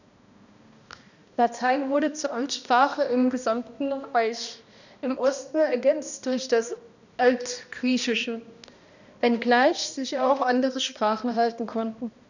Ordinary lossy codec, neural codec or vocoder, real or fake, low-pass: none; codec, 16 kHz, 1 kbps, X-Codec, HuBERT features, trained on balanced general audio; fake; 7.2 kHz